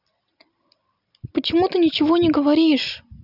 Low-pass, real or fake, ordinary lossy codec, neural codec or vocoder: 5.4 kHz; real; none; none